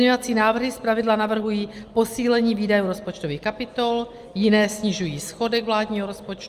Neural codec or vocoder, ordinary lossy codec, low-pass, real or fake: none; Opus, 32 kbps; 14.4 kHz; real